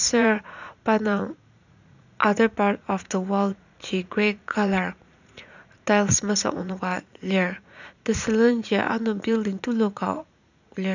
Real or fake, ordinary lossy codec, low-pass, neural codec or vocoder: fake; none; 7.2 kHz; vocoder, 44.1 kHz, 80 mel bands, Vocos